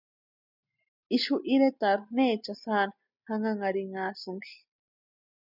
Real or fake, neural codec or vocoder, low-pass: real; none; 5.4 kHz